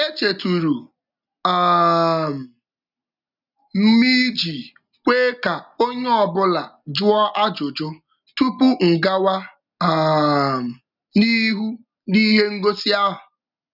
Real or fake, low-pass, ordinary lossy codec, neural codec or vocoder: real; 5.4 kHz; none; none